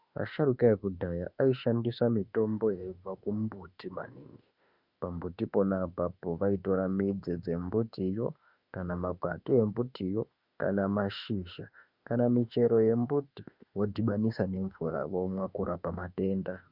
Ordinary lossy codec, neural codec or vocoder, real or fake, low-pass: Opus, 64 kbps; autoencoder, 48 kHz, 32 numbers a frame, DAC-VAE, trained on Japanese speech; fake; 5.4 kHz